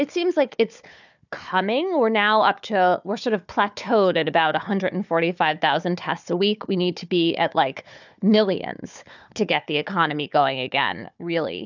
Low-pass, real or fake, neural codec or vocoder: 7.2 kHz; fake; codec, 16 kHz, 4 kbps, FunCodec, trained on Chinese and English, 50 frames a second